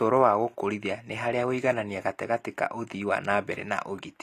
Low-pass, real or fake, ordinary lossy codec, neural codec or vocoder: 14.4 kHz; real; AAC, 48 kbps; none